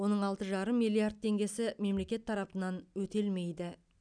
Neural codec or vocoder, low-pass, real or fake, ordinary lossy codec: none; 9.9 kHz; real; none